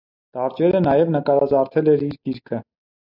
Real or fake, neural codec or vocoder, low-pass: real; none; 5.4 kHz